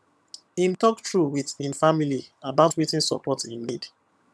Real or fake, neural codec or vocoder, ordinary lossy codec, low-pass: fake; vocoder, 22.05 kHz, 80 mel bands, HiFi-GAN; none; none